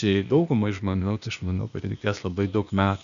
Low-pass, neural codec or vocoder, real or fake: 7.2 kHz; codec, 16 kHz, 0.8 kbps, ZipCodec; fake